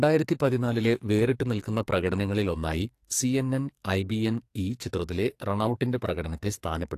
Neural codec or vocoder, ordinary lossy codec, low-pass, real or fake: codec, 44.1 kHz, 2.6 kbps, SNAC; AAC, 64 kbps; 14.4 kHz; fake